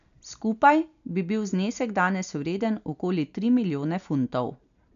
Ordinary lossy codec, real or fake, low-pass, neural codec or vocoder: none; real; 7.2 kHz; none